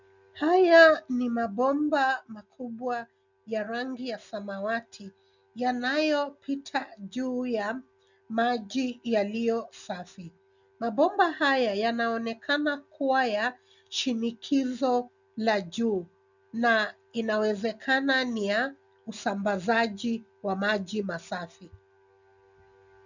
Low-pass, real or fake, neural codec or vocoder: 7.2 kHz; real; none